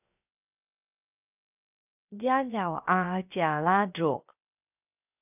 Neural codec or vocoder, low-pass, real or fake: codec, 16 kHz, 0.7 kbps, FocalCodec; 3.6 kHz; fake